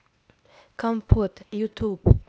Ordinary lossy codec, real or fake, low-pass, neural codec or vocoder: none; fake; none; codec, 16 kHz, 0.8 kbps, ZipCodec